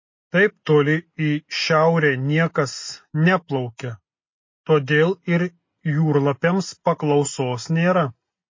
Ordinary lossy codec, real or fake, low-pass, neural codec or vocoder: MP3, 32 kbps; real; 7.2 kHz; none